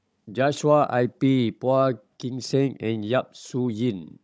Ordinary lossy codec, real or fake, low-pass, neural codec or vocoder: none; fake; none; codec, 16 kHz, 16 kbps, FunCodec, trained on Chinese and English, 50 frames a second